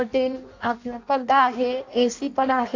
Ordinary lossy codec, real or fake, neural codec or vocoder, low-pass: AAC, 48 kbps; fake; codec, 16 kHz in and 24 kHz out, 0.6 kbps, FireRedTTS-2 codec; 7.2 kHz